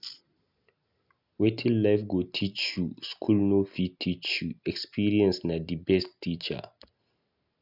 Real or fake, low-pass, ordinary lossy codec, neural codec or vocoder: real; 5.4 kHz; none; none